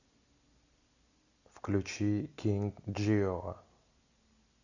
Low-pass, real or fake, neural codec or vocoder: 7.2 kHz; real; none